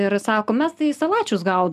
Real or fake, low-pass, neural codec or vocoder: real; 14.4 kHz; none